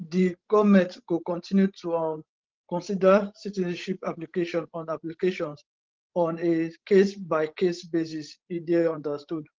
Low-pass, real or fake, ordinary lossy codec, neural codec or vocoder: 7.2 kHz; fake; Opus, 16 kbps; codec, 16 kHz, 16 kbps, FreqCodec, larger model